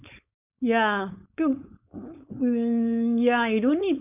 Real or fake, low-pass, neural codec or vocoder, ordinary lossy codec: fake; 3.6 kHz; codec, 16 kHz, 4.8 kbps, FACodec; none